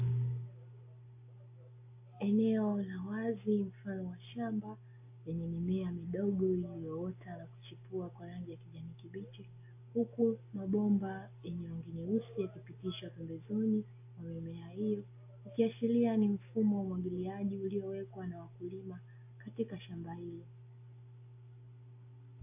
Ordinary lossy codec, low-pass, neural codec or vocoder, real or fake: AAC, 32 kbps; 3.6 kHz; none; real